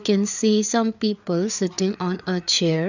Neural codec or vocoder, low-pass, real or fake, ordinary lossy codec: codec, 16 kHz, 4 kbps, FreqCodec, larger model; 7.2 kHz; fake; none